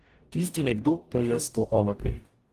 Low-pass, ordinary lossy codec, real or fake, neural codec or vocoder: 14.4 kHz; Opus, 24 kbps; fake; codec, 44.1 kHz, 0.9 kbps, DAC